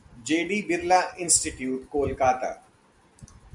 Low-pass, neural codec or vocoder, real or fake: 10.8 kHz; none; real